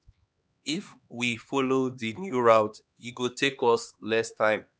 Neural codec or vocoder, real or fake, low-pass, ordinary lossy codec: codec, 16 kHz, 2 kbps, X-Codec, HuBERT features, trained on LibriSpeech; fake; none; none